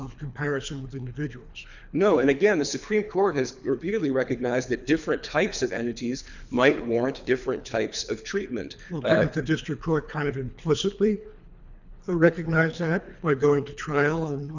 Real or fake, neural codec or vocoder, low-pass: fake; codec, 24 kHz, 3 kbps, HILCodec; 7.2 kHz